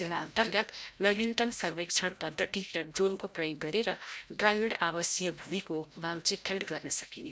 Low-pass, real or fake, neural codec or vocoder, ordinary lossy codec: none; fake; codec, 16 kHz, 0.5 kbps, FreqCodec, larger model; none